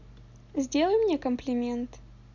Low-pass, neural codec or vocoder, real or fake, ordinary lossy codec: 7.2 kHz; none; real; none